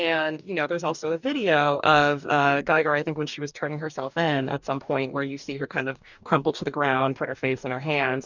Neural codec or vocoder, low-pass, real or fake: codec, 44.1 kHz, 2.6 kbps, DAC; 7.2 kHz; fake